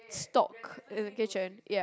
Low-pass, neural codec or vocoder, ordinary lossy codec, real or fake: none; none; none; real